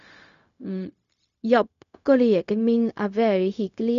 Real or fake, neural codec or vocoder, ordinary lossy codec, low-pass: fake; codec, 16 kHz, 0.4 kbps, LongCat-Audio-Codec; MP3, 96 kbps; 7.2 kHz